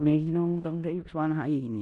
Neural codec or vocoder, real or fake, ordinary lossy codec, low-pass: codec, 16 kHz in and 24 kHz out, 0.9 kbps, LongCat-Audio-Codec, four codebook decoder; fake; none; 10.8 kHz